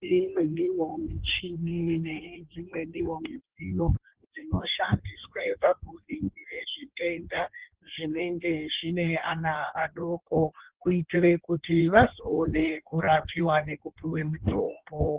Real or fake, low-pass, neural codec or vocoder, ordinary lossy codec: fake; 3.6 kHz; codec, 16 kHz in and 24 kHz out, 1.1 kbps, FireRedTTS-2 codec; Opus, 16 kbps